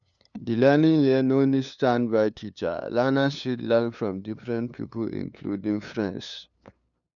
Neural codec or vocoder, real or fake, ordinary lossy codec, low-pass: codec, 16 kHz, 2 kbps, FunCodec, trained on LibriTTS, 25 frames a second; fake; none; 7.2 kHz